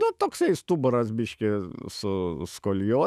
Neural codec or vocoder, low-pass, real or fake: autoencoder, 48 kHz, 128 numbers a frame, DAC-VAE, trained on Japanese speech; 14.4 kHz; fake